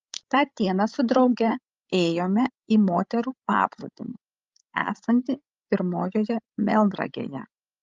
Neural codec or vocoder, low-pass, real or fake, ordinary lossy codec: codec, 16 kHz, 16 kbps, FreqCodec, larger model; 7.2 kHz; fake; Opus, 32 kbps